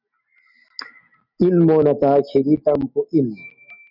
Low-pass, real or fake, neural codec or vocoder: 5.4 kHz; real; none